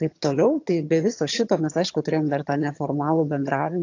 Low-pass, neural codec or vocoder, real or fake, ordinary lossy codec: 7.2 kHz; vocoder, 22.05 kHz, 80 mel bands, HiFi-GAN; fake; AAC, 48 kbps